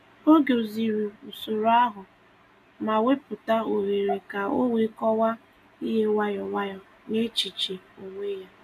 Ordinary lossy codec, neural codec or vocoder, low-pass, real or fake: none; none; 14.4 kHz; real